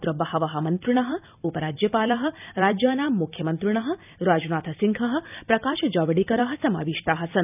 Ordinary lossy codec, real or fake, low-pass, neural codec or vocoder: none; fake; 3.6 kHz; vocoder, 44.1 kHz, 128 mel bands every 256 samples, BigVGAN v2